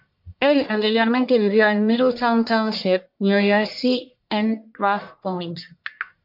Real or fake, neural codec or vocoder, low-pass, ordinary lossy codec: fake; codec, 44.1 kHz, 1.7 kbps, Pupu-Codec; 5.4 kHz; MP3, 48 kbps